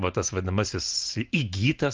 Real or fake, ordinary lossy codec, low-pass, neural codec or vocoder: real; Opus, 24 kbps; 7.2 kHz; none